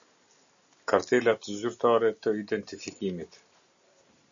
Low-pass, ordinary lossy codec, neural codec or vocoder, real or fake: 7.2 kHz; MP3, 96 kbps; none; real